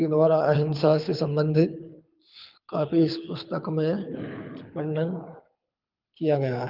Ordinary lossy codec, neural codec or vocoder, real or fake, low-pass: Opus, 24 kbps; codec, 24 kHz, 6 kbps, HILCodec; fake; 5.4 kHz